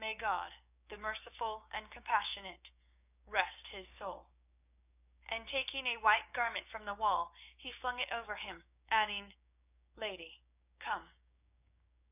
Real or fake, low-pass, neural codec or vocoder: fake; 3.6 kHz; codec, 16 kHz, 6 kbps, DAC